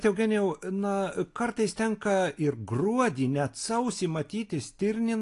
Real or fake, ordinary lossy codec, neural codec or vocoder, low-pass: real; AAC, 48 kbps; none; 10.8 kHz